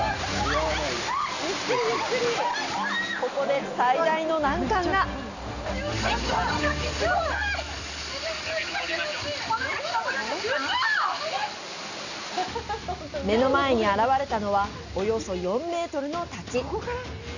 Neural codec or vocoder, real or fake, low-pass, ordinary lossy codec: none; real; 7.2 kHz; none